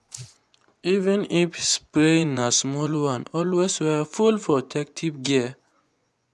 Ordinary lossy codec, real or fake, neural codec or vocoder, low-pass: none; fake; vocoder, 24 kHz, 100 mel bands, Vocos; none